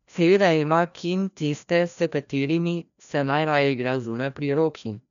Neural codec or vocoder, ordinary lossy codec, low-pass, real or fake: codec, 16 kHz, 1 kbps, FreqCodec, larger model; none; 7.2 kHz; fake